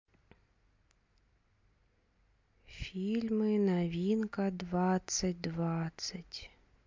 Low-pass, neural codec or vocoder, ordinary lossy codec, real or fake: 7.2 kHz; none; MP3, 48 kbps; real